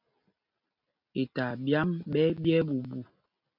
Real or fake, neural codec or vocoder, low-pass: real; none; 5.4 kHz